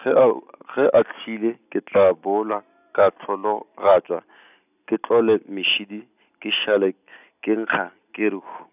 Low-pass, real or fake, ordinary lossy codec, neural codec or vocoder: 3.6 kHz; real; none; none